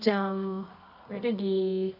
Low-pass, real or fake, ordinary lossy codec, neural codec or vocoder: 5.4 kHz; fake; none; codec, 24 kHz, 0.9 kbps, WavTokenizer, medium music audio release